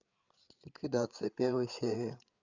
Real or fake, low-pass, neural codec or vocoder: fake; 7.2 kHz; codec, 16 kHz, 8 kbps, FreqCodec, larger model